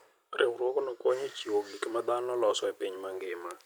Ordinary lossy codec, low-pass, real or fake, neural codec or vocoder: none; none; real; none